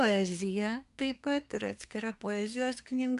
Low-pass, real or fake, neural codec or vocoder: 10.8 kHz; fake; codec, 24 kHz, 1 kbps, SNAC